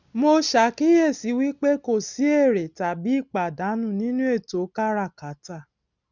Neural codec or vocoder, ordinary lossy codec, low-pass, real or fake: none; none; 7.2 kHz; real